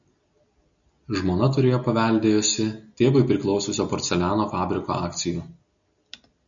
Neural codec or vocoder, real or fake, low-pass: none; real; 7.2 kHz